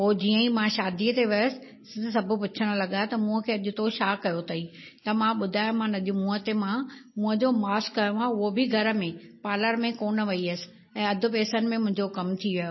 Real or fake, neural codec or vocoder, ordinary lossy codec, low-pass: real; none; MP3, 24 kbps; 7.2 kHz